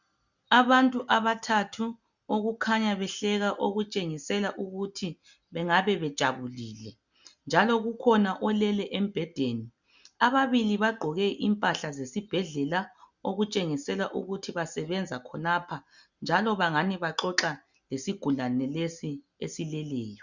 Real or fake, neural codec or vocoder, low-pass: real; none; 7.2 kHz